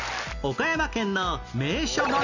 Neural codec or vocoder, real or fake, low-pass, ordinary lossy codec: none; real; 7.2 kHz; none